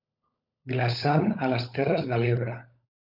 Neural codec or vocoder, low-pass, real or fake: codec, 16 kHz, 16 kbps, FunCodec, trained on LibriTTS, 50 frames a second; 5.4 kHz; fake